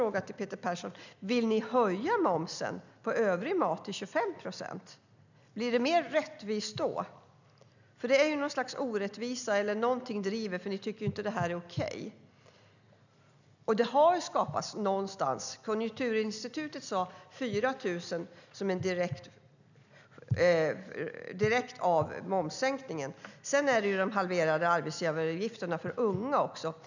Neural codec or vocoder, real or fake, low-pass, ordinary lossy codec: none; real; 7.2 kHz; none